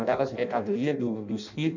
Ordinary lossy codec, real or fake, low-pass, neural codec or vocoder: none; fake; 7.2 kHz; codec, 16 kHz in and 24 kHz out, 0.6 kbps, FireRedTTS-2 codec